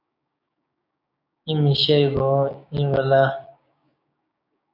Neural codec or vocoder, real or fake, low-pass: codec, 16 kHz, 6 kbps, DAC; fake; 5.4 kHz